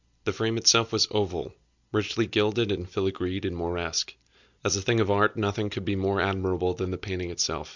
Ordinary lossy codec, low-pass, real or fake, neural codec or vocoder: Opus, 64 kbps; 7.2 kHz; real; none